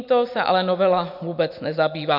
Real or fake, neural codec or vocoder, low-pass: real; none; 5.4 kHz